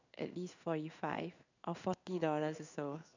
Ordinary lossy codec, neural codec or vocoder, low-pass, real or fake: none; codec, 16 kHz in and 24 kHz out, 1 kbps, XY-Tokenizer; 7.2 kHz; fake